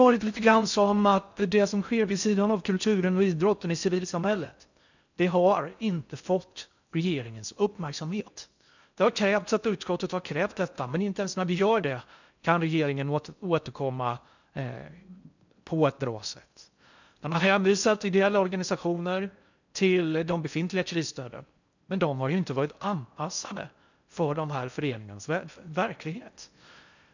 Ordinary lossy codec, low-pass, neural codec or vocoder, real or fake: none; 7.2 kHz; codec, 16 kHz in and 24 kHz out, 0.6 kbps, FocalCodec, streaming, 4096 codes; fake